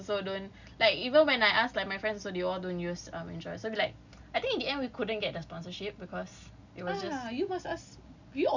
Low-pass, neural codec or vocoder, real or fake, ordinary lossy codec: 7.2 kHz; none; real; none